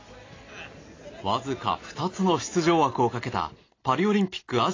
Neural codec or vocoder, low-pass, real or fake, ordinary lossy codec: none; 7.2 kHz; real; AAC, 32 kbps